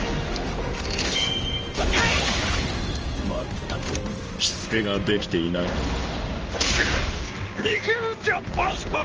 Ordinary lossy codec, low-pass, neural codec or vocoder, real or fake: Opus, 24 kbps; 7.2 kHz; codec, 16 kHz in and 24 kHz out, 1 kbps, XY-Tokenizer; fake